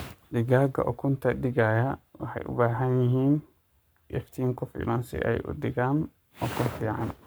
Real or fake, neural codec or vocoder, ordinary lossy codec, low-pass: fake; codec, 44.1 kHz, 7.8 kbps, Pupu-Codec; none; none